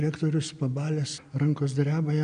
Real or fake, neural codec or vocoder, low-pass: real; none; 9.9 kHz